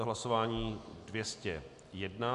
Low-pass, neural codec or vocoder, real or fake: 10.8 kHz; none; real